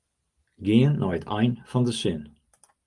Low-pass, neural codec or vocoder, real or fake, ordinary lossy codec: 10.8 kHz; none; real; Opus, 32 kbps